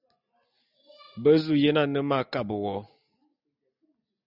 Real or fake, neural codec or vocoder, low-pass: real; none; 5.4 kHz